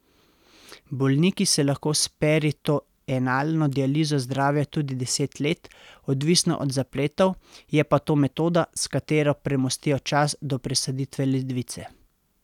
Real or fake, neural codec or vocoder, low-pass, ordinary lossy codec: real; none; 19.8 kHz; none